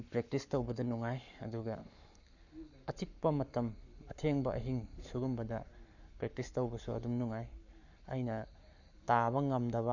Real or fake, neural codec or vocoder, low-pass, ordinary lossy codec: fake; codec, 44.1 kHz, 7.8 kbps, Pupu-Codec; 7.2 kHz; none